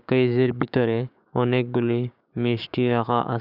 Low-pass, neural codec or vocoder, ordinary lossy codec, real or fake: 5.4 kHz; codec, 16 kHz, 6 kbps, DAC; none; fake